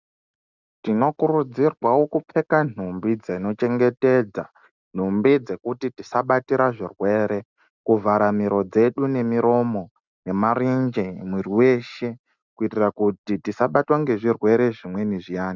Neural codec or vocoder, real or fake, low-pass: none; real; 7.2 kHz